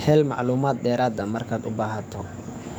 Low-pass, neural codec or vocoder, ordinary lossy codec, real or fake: none; codec, 44.1 kHz, 7.8 kbps, DAC; none; fake